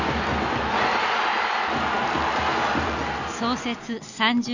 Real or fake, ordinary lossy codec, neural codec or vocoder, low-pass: real; none; none; 7.2 kHz